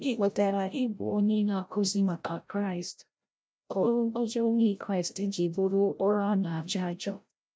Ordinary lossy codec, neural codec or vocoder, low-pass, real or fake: none; codec, 16 kHz, 0.5 kbps, FreqCodec, larger model; none; fake